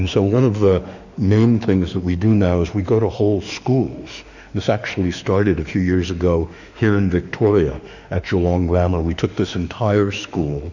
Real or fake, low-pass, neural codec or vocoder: fake; 7.2 kHz; autoencoder, 48 kHz, 32 numbers a frame, DAC-VAE, trained on Japanese speech